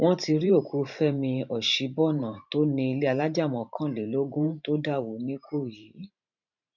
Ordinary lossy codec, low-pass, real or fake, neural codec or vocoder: none; 7.2 kHz; fake; vocoder, 44.1 kHz, 128 mel bands every 512 samples, BigVGAN v2